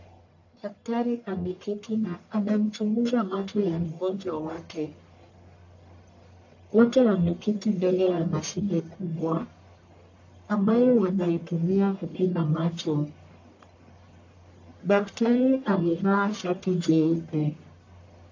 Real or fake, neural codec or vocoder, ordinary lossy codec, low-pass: fake; codec, 44.1 kHz, 1.7 kbps, Pupu-Codec; AAC, 48 kbps; 7.2 kHz